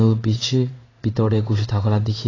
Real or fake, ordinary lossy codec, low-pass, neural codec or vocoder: fake; AAC, 32 kbps; 7.2 kHz; codec, 16 kHz in and 24 kHz out, 1 kbps, XY-Tokenizer